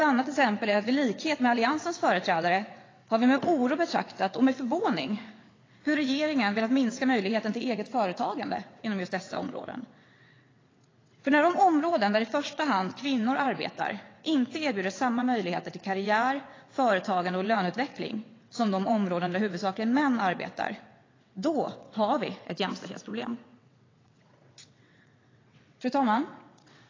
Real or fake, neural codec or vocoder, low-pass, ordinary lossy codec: fake; vocoder, 22.05 kHz, 80 mel bands, WaveNeXt; 7.2 kHz; AAC, 32 kbps